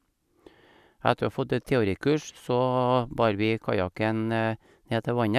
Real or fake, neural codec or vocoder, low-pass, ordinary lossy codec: real; none; 14.4 kHz; none